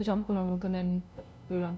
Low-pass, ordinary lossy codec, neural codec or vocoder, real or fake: none; none; codec, 16 kHz, 0.5 kbps, FunCodec, trained on LibriTTS, 25 frames a second; fake